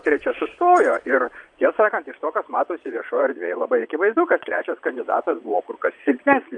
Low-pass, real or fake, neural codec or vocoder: 9.9 kHz; fake; vocoder, 22.05 kHz, 80 mel bands, Vocos